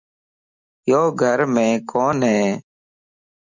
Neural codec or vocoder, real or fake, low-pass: none; real; 7.2 kHz